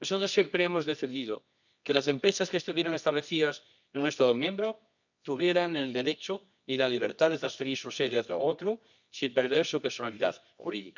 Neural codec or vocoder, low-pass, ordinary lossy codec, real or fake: codec, 24 kHz, 0.9 kbps, WavTokenizer, medium music audio release; 7.2 kHz; none; fake